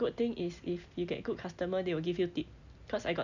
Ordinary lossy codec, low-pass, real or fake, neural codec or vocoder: none; 7.2 kHz; real; none